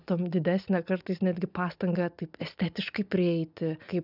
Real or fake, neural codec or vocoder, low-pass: real; none; 5.4 kHz